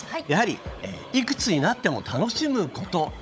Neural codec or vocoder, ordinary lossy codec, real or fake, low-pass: codec, 16 kHz, 16 kbps, FunCodec, trained on LibriTTS, 50 frames a second; none; fake; none